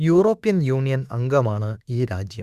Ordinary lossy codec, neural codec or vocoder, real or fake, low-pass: Opus, 64 kbps; autoencoder, 48 kHz, 32 numbers a frame, DAC-VAE, trained on Japanese speech; fake; 14.4 kHz